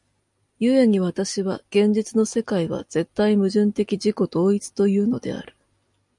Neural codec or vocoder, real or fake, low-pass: none; real; 10.8 kHz